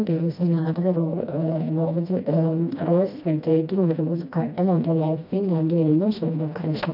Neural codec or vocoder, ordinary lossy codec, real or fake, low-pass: codec, 16 kHz, 1 kbps, FreqCodec, smaller model; none; fake; 5.4 kHz